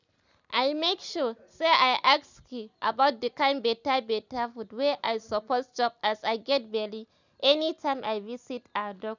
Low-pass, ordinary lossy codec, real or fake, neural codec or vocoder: 7.2 kHz; none; real; none